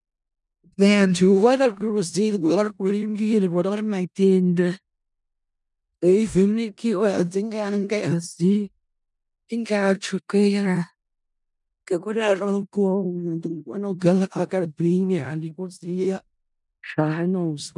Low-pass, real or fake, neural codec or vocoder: 10.8 kHz; fake; codec, 16 kHz in and 24 kHz out, 0.4 kbps, LongCat-Audio-Codec, four codebook decoder